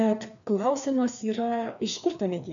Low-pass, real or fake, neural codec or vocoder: 7.2 kHz; fake; codec, 16 kHz, 2 kbps, FreqCodec, larger model